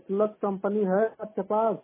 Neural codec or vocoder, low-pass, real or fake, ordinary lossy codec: none; 3.6 kHz; real; MP3, 16 kbps